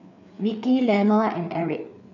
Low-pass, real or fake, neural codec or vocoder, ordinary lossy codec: 7.2 kHz; fake; codec, 16 kHz, 4 kbps, FreqCodec, larger model; none